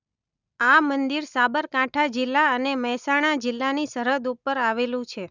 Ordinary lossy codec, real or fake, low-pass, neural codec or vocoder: none; real; 7.2 kHz; none